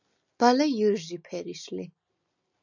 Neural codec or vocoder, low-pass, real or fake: none; 7.2 kHz; real